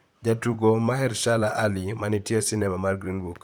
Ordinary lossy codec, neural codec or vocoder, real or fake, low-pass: none; vocoder, 44.1 kHz, 128 mel bands, Pupu-Vocoder; fake; none